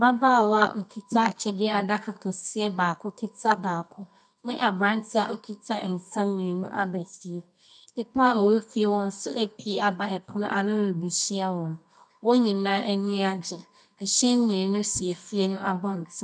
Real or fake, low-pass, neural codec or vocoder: fake; 9.9 kHz; codec, 24 kHz, 0.9 kbps, WavTokenizer, medium music audio release